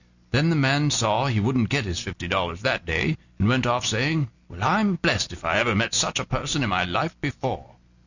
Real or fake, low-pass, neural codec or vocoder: real; 7.2 kHz; none